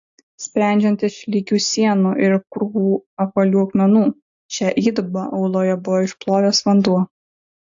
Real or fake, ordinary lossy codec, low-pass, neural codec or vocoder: real; AAC, 64 kbps; 7.2 kHz; none